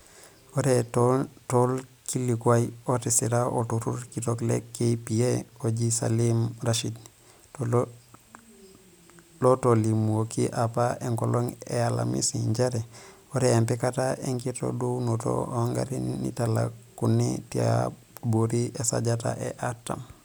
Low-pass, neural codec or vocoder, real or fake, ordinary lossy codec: none; none; real; none